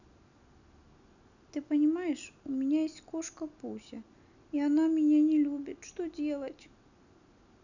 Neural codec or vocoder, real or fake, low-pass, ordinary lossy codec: none; real; 7.2 kHz; none